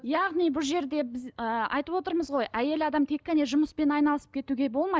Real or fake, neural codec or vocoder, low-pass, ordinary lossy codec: real; none; none; none